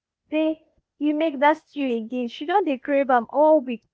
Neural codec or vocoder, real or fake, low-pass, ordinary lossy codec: codec, 16 kHz, 0.8 kbps, ZipCodec; fake; none; none